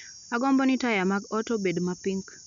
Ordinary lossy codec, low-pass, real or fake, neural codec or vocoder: none; 7.2 kHz; real; none